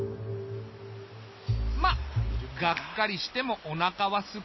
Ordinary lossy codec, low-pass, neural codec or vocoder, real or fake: MP3, 24 kbps; 7.2 kHz; none; real